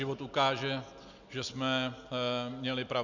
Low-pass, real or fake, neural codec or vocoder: 7.2 kHz; real; none